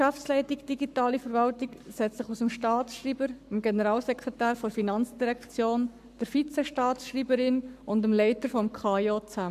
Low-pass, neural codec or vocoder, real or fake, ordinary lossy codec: 14.4 kHz; codec, 44.1 kHz, 7.8 kbps, Pupu-Codec; fake; AAC, 96 kbps